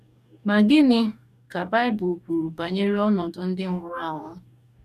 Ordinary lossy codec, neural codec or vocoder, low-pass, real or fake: none; codec, 44.1 kHz, 2.6 kbps, DAC; 14.4 kHz; fake